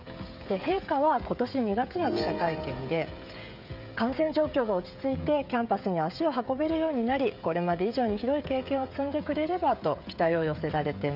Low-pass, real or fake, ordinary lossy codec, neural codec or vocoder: 5.4 kHz; fake; none; codec, 16 kHz, 16 kbps, FreqCodec, smaller model